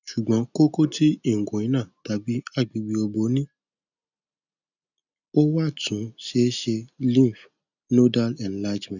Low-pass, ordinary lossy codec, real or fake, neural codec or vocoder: 7.2 kHz; none; real; none